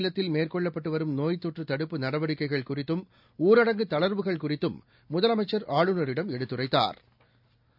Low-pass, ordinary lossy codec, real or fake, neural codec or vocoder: 5.4 kHz; none; real; none